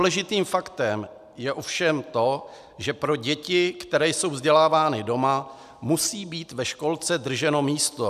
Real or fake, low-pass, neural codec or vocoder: real; 14.4 kHz; none